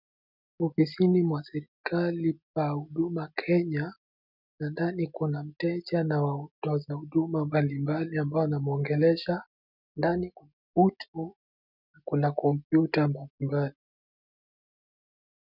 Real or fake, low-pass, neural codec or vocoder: real; 5.4 kHz; none